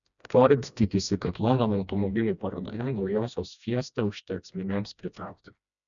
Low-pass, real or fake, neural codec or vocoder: 7.2 kHz; fake; codec, 16 kHz, 1 kbps, FreqCodec, smaller model